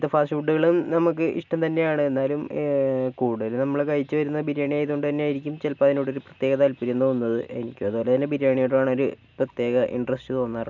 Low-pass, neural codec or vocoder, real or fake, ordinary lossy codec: 7.2 kHz; none; real; none